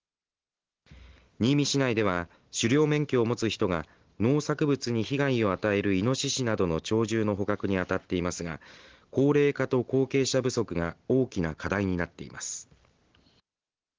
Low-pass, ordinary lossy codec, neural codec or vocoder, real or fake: 7.2 kHz; Opus, 16 kbps; none; real